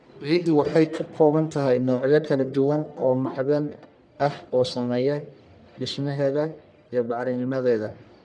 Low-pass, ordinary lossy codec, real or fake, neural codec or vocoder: 9.9 kHz; none; fake; codec, 44.1 kHz, 1.7 kbps, Pupu-Codec